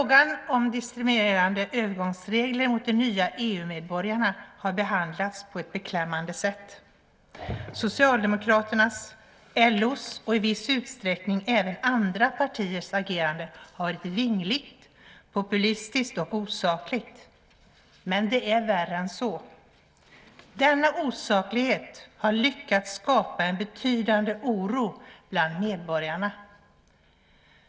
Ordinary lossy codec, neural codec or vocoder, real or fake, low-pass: none; none; real; none